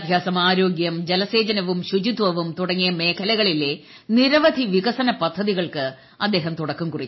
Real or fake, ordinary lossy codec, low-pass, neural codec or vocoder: real; MP3, 24 kbps; 7.2 kHz; none